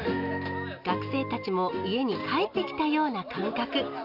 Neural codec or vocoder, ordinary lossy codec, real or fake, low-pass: none; none; real; 5.4 kHz